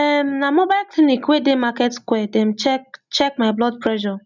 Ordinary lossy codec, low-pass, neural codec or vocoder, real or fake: none; 7.2 kHz; none; real